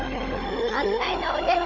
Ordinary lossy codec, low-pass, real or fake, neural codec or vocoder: none; 7.2 kHz; fake; codec, 16 kHz, 16 kbps, FunCodec, trained on Chinese and English, 50 frames a second